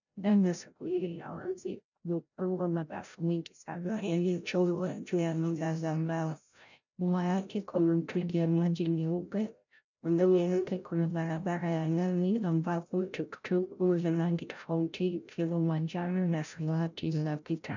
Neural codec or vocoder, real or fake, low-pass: codec, 16 kHz, 0.5 kbps, FreqCodec, larger model; fake; 7.2 kHz